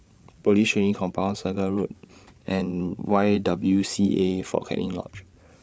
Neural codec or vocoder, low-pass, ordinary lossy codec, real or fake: codec, 16 kHz, 16 kbps, FreqCodec, larger model; none; none; fake